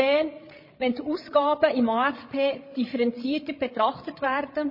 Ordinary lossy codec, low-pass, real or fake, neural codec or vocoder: MP3, 24 kbps; 5.4 kHz; fake; codec, 16 kHz, 16 kbps, FreqCodec, larger model